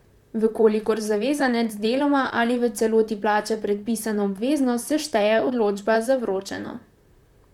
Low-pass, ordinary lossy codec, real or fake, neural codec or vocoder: 19.8 kHz; MP3, 96 kbps; fake; vocoder, 44.1 kHz, 128 mel bands, Pupu-Vocoder